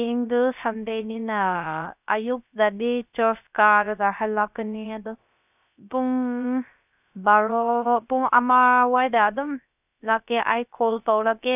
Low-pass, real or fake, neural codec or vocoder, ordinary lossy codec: 3.6 kHz; fake; codec, 16 kHz, 0.3 kbps, FocalCodec; none